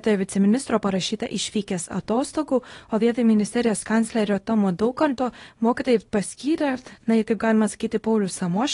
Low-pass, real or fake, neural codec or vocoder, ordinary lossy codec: 10.8 kHz; fake; codec, 24 kHz, 0.9 kbps, WavTokenizer, small release; AAC, 32 kbps